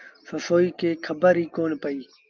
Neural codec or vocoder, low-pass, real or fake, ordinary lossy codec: none; 7.2 kHz; real; Opus, 24 kbps